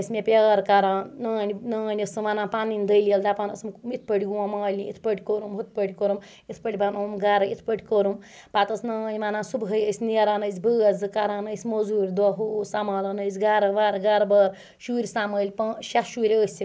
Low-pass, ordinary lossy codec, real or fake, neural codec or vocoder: none; none; real; none